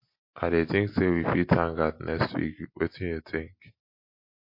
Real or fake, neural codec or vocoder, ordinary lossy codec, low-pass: real; none; MP3, 32 kbps; 5.4 kHz